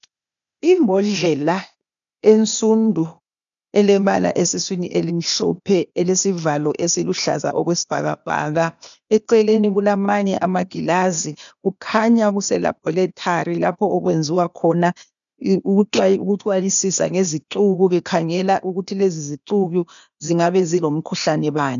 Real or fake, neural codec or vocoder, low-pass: fake; codec, 16 kHz, 0.8 kbps, ZipCodec; 7.2 kHz